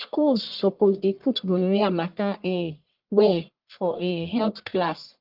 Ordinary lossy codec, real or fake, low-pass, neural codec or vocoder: Opus, 24 kbps; fake; 5.4 kHz; codec, 44.1 kHz, 1.7 kbps, Pupu-Codec